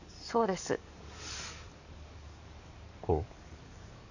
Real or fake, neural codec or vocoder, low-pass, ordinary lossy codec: fake; codec, 44.1 kHz, 7.8 kbps, DAC; 7.2 kHz; none